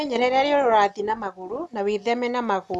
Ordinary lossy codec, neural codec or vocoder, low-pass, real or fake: none; none; none; real